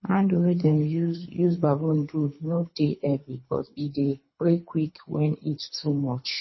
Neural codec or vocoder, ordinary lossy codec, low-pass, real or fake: codec, 24 kHz, 3 kbps, HILCodec; MP3, 24 kbps; 7.2 kHz; fake